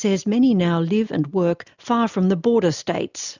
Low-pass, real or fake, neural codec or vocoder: 7.2 kHz; real; none